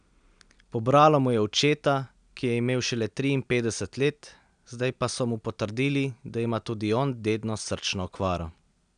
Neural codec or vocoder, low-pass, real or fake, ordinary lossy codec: none; 9.9 kHz; real; none